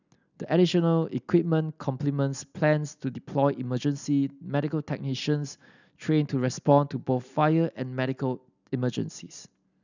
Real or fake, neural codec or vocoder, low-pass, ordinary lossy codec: real; none; 7.2 kHz; none